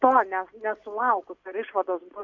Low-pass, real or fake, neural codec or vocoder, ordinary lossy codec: 7.2 kHz; real; none; AAC, 48 kbps